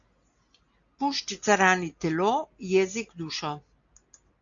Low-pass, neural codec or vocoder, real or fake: 7.2 kHz; none; real